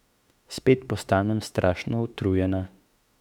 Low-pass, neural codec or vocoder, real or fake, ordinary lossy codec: 19.8 kHz; autoencoder, 48 kHz, 32 numbers a frame, DAC-VAE, trained on Japanese speech; fake; none